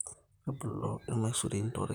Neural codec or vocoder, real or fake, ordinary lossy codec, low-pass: vocoder, 44.1 kHz, 128 mel bands, Pupu-Vocoder; fake; none; none